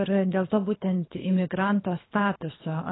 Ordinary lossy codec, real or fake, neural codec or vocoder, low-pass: AAC, 16 kbps; fake; codec, 24 kHz, 3 kbps, HILCodec; 7.2 kHz